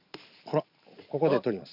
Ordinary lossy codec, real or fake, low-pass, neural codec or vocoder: none; fake; 5.4 kHz; vocoder, 44.1 kHz, 128 mel bands every 512 samples, BigVGAN v2